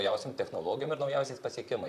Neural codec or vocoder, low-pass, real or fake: vocoder, 44.1 kHz, 128 mel bands, Pupu-Vocoder; 14.4 kHz; fake